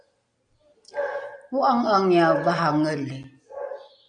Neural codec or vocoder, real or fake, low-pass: none; real; 9.9 kHz